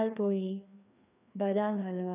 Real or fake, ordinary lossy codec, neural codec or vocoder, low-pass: fake; none; codec, 16 kHz, 2 kbps, FreqCodec, larger model; 3.6 kHz